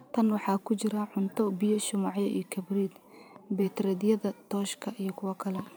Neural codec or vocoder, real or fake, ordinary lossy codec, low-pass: vocoder, 44.1 kHz, 128 mel bands every 512 samples, BigVGAN v2; fake; none; none